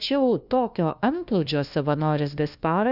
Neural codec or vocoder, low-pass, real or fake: codec, 16 kHz, 1 kbps, FunCodec, trained on LibriTTS, 50 frames a second; 5.4 kHz; fake